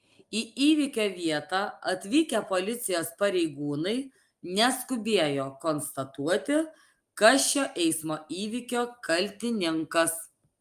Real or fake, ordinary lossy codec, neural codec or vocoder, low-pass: real; Opus, 32 kbps; none; 14.4 kHz